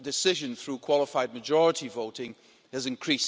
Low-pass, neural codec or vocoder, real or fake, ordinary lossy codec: none; none; real; none